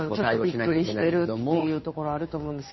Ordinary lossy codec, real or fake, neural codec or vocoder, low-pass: MP3, 24 kbps; fake; codec, 16 kHz, 2 kbps, FunCodec, trained on Chinese and English, 25 frames a second; 7.2 kHz